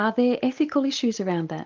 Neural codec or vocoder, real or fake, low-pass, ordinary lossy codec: none; real; 7.2 kHz; Opus, 32 kbps